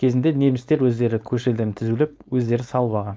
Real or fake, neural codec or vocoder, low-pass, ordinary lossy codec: fake; codec, 16 kHz, 4.8 kbps, FACodec; none; none